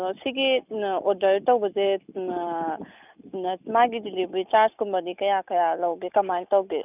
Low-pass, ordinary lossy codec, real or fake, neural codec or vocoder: 3.6 kHz; none; real; none